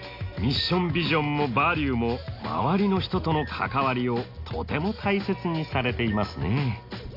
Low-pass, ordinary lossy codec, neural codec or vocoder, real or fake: 5.4 kHz; AAC, 32 kbps; none; real